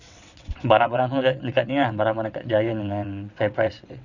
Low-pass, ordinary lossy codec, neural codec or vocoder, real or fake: 7.2 kHz; none; vocoder, 22.05 kHz, 80 mel bands, WaveNeXt; fake